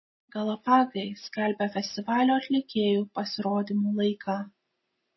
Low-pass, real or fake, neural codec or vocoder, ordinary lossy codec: 7.2 kHz; real; none; MP3, 24 kbps